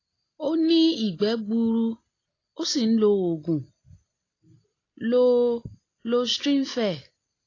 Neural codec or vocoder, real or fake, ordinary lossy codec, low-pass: none; real; AAC, 32 kbps; 7.2 kHz